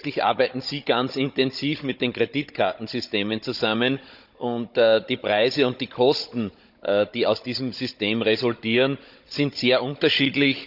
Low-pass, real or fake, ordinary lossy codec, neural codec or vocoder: 5.4 kHz; fake; none; codec, 16 kHz, 16 kbps, FunCodec, trained on Chinese and English, 50 frames a second